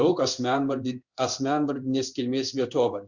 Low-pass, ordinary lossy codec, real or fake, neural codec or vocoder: 7.2 kHz; Opus, 64 kbps; fake; codec, 16 kHz in and 24 kHz out, 1 kbps, XY-Tokenizer